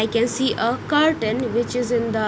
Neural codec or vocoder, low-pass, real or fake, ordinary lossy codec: none; none; real; none